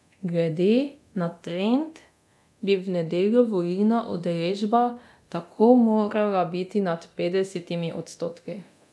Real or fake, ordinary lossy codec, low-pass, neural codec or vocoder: fake; none; none; codec, 24 kHz, 0.9 kbps, DualCodec